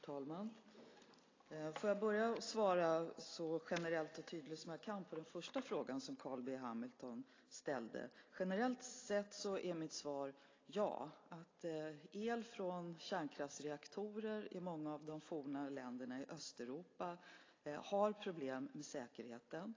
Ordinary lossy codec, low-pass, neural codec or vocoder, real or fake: AAC, 32 kbps; 7.2 kHz; none; real